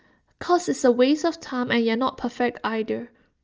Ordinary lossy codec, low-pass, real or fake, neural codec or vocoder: Opus, 24 kbps; 7.2 kHz; real; none